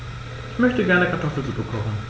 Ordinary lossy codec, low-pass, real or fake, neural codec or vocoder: none; none; real; none